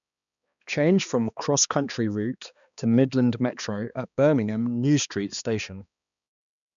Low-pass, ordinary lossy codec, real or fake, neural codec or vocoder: 7.2 kHz; none; fake; codec, 16 kHz, 2 kbps, X-Codec, HuBERT features, trained on balanced general audio